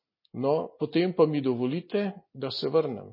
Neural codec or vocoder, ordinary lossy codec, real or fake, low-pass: none; MP3, 24 kbps; real; 5.4 kHz